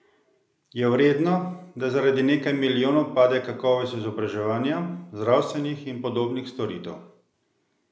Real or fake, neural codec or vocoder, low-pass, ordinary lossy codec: real; none; none; none